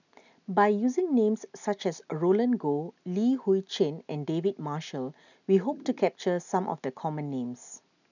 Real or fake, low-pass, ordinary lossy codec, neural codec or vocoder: real; 7.2 kHz; none; none